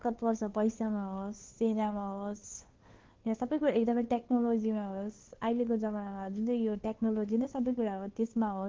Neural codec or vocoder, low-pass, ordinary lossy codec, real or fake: codec, 16 kHz, 2 kbps, FunCodec, trained on Chinese and English, 25 frames a second; 7.2 kHz; Opus, 16 kbps; fake